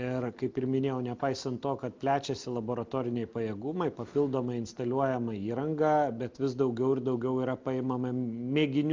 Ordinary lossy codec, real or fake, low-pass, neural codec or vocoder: Opus, 24 kbps; real; 7.2 kHz; none